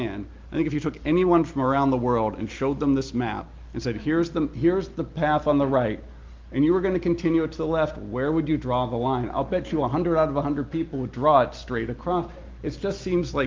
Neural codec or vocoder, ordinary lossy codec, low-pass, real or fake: none; Opus, 32 kbps; 7.2 kHz; real